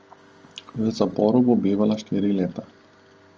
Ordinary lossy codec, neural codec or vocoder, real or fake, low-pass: Opus, 24 kbps; none; real; 7.2 kHz